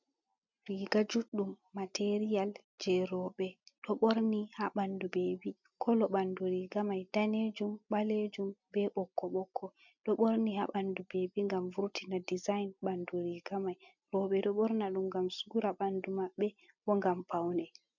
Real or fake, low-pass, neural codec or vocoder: real; 7.2 kHz; none